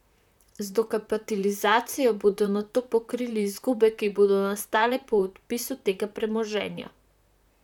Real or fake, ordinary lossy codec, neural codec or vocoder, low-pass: fake; none; vocoder, 44.1 kHz, 128 mel bands, Pupu-Vocoder; 19.8 kHz